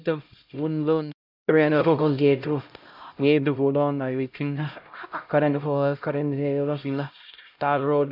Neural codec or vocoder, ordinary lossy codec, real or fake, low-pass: codec, 16 kHz, 0.5 kbps, X-Codec, HuBERT features, trained on LibriSpeech; none; fake; 5.4 kHz